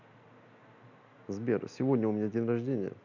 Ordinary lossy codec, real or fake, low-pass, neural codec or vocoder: none; real; 7.2 kHz; none